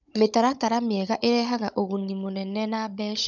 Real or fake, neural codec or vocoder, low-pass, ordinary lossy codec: fake; codec, 16 kHz, 16 kbps, FunCodec, trained on Chinese and English, 50 frames a second; 7.2 kHz; none